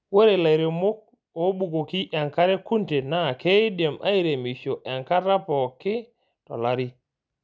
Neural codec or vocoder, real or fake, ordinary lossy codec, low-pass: none; real; none; none